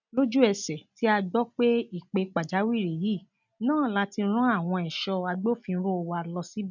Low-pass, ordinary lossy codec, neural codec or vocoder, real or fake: 7.2 kHz; none; none; real